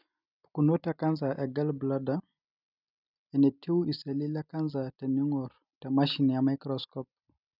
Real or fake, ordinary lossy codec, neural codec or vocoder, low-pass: real; none; none; 5.4 kHz